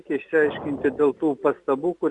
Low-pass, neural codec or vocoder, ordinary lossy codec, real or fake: 10.8 kHz; none; Opus, 64 kbps; real